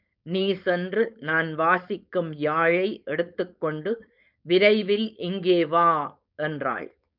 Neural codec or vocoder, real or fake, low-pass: codec, 16 kHz, 4.8 kbps, FACodec; fake; 5.4 kHz